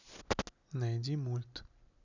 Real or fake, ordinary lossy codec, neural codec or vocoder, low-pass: real; none; none; 7.2 kHz